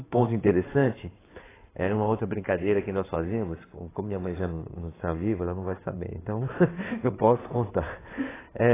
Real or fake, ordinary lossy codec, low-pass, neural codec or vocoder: fake; AAC, 16 kbps; 3.6 kHz; codec, 16 kHz in and 24 kHz out, 2.2 kbps, FireRedTTS-2 codec